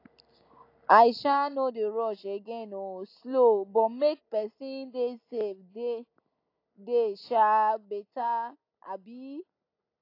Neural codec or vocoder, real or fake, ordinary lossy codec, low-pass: none; real; AAC, 32 kbps; 5.4 kHz